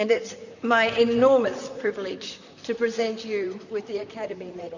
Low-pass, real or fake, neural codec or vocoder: 7.2 kHz; fake; vocoder, 44.1 kHz, 128 mel bands, Pupu-Vocoder